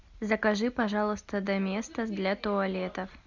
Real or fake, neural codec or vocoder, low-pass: real; none; 7.2 kHz